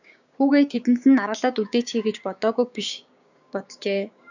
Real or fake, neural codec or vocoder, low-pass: fake; codec, 16 kHz, 6 kbps, DAC; 7.2 kHz